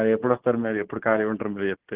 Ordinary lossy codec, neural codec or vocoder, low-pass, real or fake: Opus, 16 kbps; none; 3.6 kHz; real